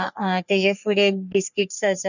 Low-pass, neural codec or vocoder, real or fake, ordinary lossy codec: 7.2 kHz; codec, 44.1 kHz, 3.4 kbps, Pupu-Codec; fake; none